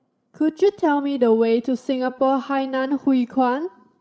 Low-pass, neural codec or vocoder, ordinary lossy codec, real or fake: none; codec, 16 kHz, 16 kbps, FreqCodec, larger model; none; fake